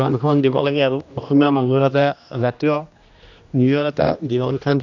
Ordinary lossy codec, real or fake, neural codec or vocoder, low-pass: none; fake; codec, 16 kHz, 1 kbps, X-Codec, HuBERT features, trained on general audio; 7.2 kHz